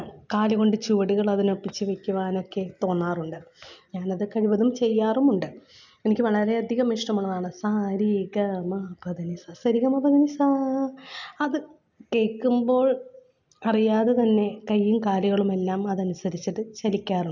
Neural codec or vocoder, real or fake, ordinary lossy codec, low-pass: none; real; none; 7.2 kHz